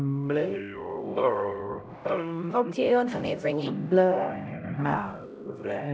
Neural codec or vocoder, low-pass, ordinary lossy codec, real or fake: codec, 16 kHz, 0.5 kbps, X-Codec, HuBERT features, trained on LibriSpeech; none; none; fake